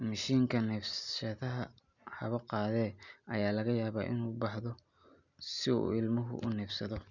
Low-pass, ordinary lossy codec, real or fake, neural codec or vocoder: 7.2 kHz; none; real; none